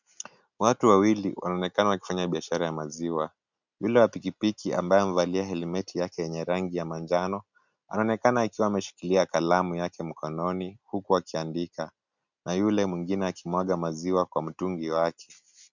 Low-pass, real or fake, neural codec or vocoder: 7.2 kHz; real; none